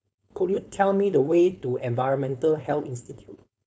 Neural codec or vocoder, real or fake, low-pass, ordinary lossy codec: codec, 16 kHz, 4.8 kbps, FACodec; fake; none; none